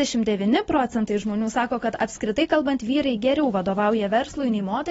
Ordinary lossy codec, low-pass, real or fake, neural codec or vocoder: AAC, 24 kbps; 19.8 kHz; real; none